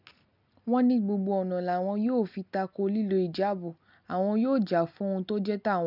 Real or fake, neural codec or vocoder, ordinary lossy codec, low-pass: real; none; none; 5.4 kHz